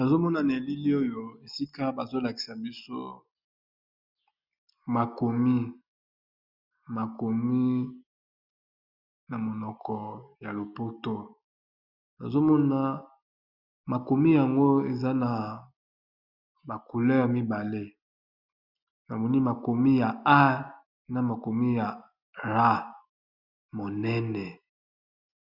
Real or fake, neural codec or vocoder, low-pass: real; none; 5.4 kHz